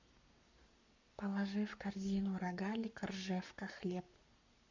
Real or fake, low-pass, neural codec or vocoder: fake; 7.2 kHz; codec, 44.1 kHz, 7.8 kbps, Pupu-Codec